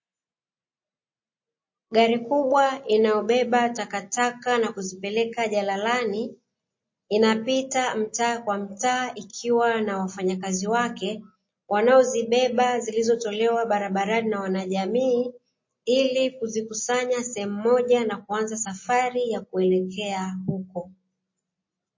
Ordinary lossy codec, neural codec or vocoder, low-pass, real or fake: MP3, 32 kbps; none; 7.2 kHz; real